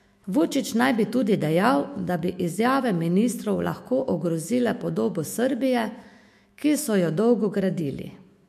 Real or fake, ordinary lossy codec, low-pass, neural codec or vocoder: fake; MP3, 64 kbps; 14.4 kHz; autoencoder, 48 kHz, 128 numbers a frame, DAC-VAE, trained on Japanese speech